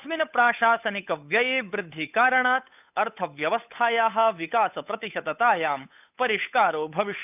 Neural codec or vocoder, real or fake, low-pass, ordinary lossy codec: codec, 16 kHz, 8 kbps, FunCodec, trained on Chinese and English, 25 frames a second; fake; 3.6 kHz; none